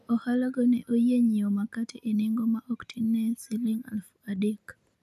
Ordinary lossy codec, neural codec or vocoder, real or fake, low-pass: none; none; real; 14.4 kHz